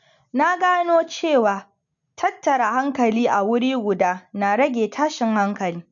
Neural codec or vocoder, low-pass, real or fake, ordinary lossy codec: none; 7.2 kHz; real; none